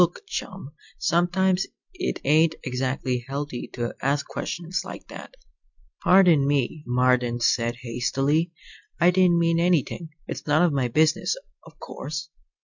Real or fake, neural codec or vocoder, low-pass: real; none; 7.2 kHz